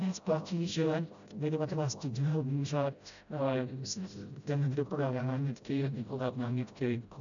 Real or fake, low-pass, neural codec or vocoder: fake; 7.2 kHz; codec, 16 kHz, 0.5 kbps, FreqCodec, smaller model